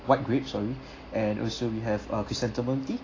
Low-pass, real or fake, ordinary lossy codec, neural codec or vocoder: 7.2 kHz; real; AAC, 32 kbps; none